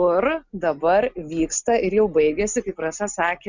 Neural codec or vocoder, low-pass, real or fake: none; 7.2 kHz; real